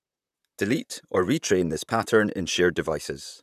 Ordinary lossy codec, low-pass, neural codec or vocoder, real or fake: none; 14.4 kHz; vocoder, 44.1 kHz, 128 mel bands, Pupu-Vocoder; fake